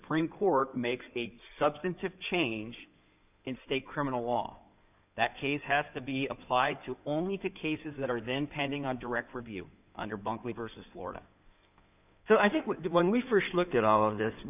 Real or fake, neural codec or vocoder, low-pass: fake; codec, 16 kHz in and 24 kHz out, 2.2 kbps, FireRedTTS-2 codec; 3.6 kHz